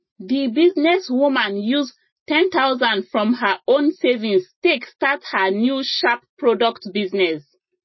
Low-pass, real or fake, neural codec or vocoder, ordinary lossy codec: 7.2 kHz; real; none; MP3, 24 kbps